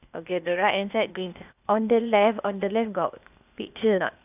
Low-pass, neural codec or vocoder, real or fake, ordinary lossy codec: 3.6 kHz; codec, 16 kHz, 0.8 kbps, ZipCodec; fake; none